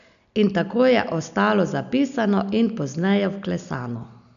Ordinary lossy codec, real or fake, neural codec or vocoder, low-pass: none; real; none; 7.2 kHz